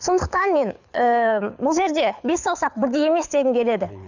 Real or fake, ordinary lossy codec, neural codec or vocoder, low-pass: fake; none; codec, 24 kHz, 6 kbps, HILCodec; 7.2 kHz